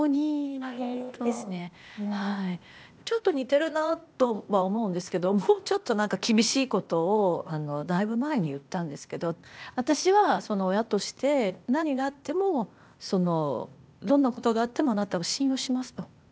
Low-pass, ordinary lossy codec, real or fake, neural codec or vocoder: none; none; fake; codec, 16 kHz, 0.8 kbps, ZipCodec